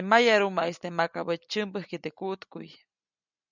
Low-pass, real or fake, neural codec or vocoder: 7.2 kHz; real; none